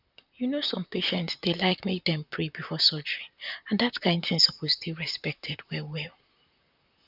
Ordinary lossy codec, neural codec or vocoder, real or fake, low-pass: none; vocoder, 44.1 kHz, 128 mel bands, Pupu-Vocoder; fake; 5.4 kHz